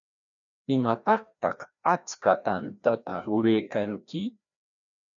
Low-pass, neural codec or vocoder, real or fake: 7.2 kHz; codec, 16 kHz, 1 kbps, FreqCodec, larger model; fake